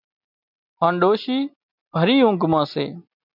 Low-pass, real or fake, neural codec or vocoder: 5.4 kHz; real; none